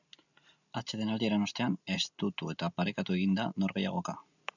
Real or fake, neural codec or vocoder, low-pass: real; none; 7.2 kHz